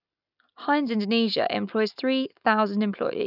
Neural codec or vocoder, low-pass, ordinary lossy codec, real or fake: none; 5.4 kHz; none; real